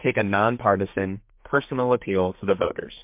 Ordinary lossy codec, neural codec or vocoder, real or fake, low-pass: MP3, 32 kbps; codec, 32 kHz, 1.9 kbps, SNAC; fake; 3.6 kHz